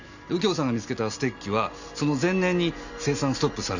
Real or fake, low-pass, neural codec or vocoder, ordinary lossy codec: real; 7.2 kHz; none; none